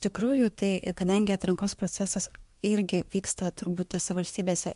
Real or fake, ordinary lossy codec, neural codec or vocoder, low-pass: fake; MP3, 64 kbps; codec, 24 kHz, 1 kbps, SNAC; 10.8 kHz